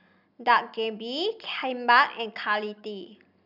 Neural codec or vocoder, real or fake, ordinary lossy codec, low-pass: none; real; none; 5.4 kHz